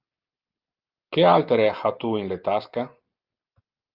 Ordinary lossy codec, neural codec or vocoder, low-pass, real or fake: Opus, 24 kbps; none; 5.4 kHz; real